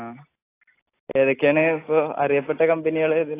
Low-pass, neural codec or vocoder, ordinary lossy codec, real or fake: 3.6 kHz; none; AAC, 24 kbps; real